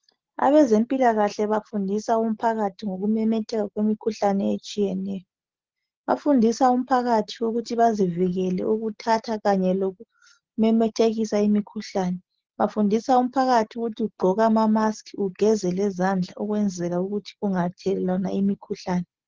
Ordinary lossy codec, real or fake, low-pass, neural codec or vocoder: Opus, 16 kbps; real; 7.2 kHz; none